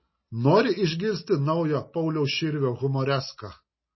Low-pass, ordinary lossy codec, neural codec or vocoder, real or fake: 7.2 kHz; MP3, 24 kbps; none; real